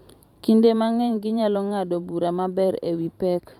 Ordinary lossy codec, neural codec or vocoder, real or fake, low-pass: none; vocoder, 44.1 kHz, 128 mel bands every 512 samples, BigVGAN v2; fake; 19.8 kHz